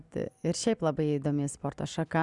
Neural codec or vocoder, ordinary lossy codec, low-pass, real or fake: none; Opus, 64 kbps; 10.8 kHz; real